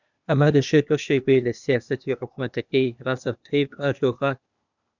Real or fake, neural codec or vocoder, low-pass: fake; codec, 16 kHz, 0.8 kbps, ZipCodec; 7.2 kHz